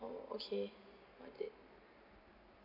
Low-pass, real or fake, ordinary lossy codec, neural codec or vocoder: 5.4 kHz; real; none; none